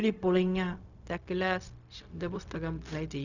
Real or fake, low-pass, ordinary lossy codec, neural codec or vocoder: fake; 7.2 kHz; none; codec, 16 kHz, 0.4 kbps, LongCat-Audio-Codec